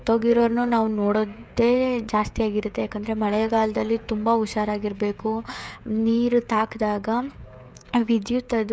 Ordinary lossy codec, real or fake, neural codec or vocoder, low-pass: none; fake; codec, 16 kHz, 16 kbps, FreqCodec, smaller model; none